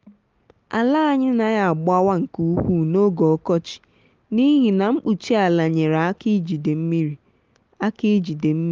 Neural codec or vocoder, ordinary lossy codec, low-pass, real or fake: none; Opus, 32 kbps; 7.2 kHz; real